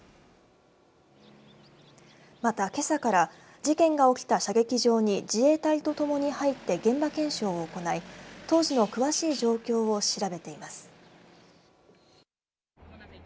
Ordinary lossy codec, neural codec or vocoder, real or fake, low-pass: none; none; real; none